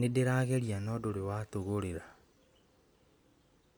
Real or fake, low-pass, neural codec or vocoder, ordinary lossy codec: real; none; none; none